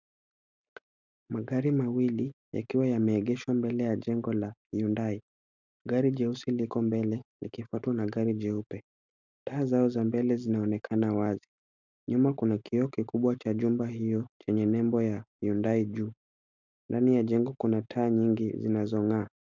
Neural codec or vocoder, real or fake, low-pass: none; real; 7.2 kHz